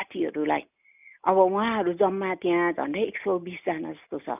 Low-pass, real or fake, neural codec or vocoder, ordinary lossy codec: 3.6 kHz; real; none; none